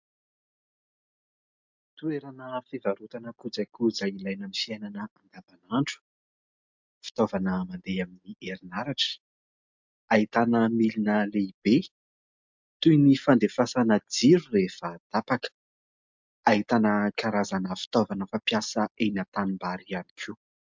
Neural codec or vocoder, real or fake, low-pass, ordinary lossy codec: none; real; 7.2 kHz; MP3, 64 kbps